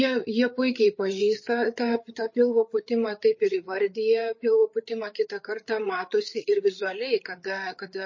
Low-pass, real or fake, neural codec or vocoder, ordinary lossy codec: 7.2 kHz; fake; codec, 16 kHz, 8 kbps, FreqCodec, larger model; MP3, 32 kbps